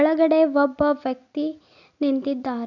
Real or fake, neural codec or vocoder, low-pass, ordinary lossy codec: real; none; 7.2 kHz; none